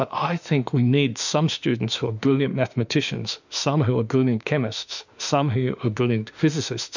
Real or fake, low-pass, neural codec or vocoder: fake; 7.2 kHz; autoencoder, 48 kHz, 32 numbers a frame, DAC-VAE, trained on Japanese speech